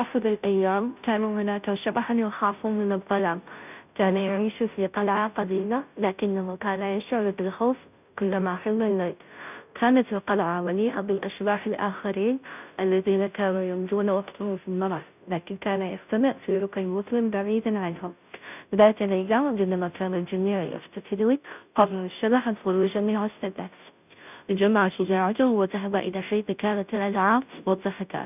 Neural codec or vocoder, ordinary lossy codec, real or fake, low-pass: codec, 16 kHz, 0.5 kbps, FunCodec, trained on Chinese and English, 25 frames a second; none; fake; 3.6 kHz